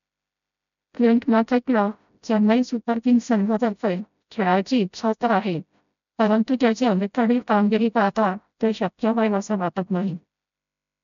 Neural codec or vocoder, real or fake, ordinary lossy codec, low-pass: codec, 16 kHz, 0.5 kbps, FreqCodec, smaller model; fake; none; 7.2 kHz